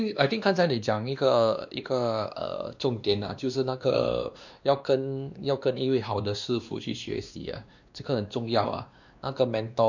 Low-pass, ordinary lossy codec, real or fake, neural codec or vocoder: 7.2 kHz; none; fake; codec, 16 kHz, 2 kbps, X-Codec, WavLM features, trained on Multilingual LibriSpeech